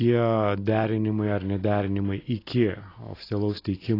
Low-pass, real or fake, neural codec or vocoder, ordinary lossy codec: 5.4 kHz; real; none; AAC, 24 kbps